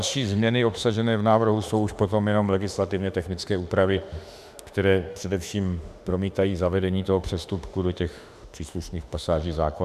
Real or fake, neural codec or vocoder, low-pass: fake; autoencoder, 48 kHz, 32 numbers a frame, DAC-VAE, trained on Japanese speech; 14.4 kHz